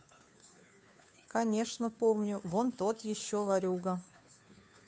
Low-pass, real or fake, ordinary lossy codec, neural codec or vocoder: none; fake; none; codec, 16 kHz, 2 kbps, FunCodec, trained on Chinese and English, 25 frames a second